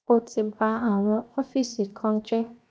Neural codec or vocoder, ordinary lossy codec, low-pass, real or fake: codec, 16 kHz, 0.7 kbps, FocalCodec; none; none; fake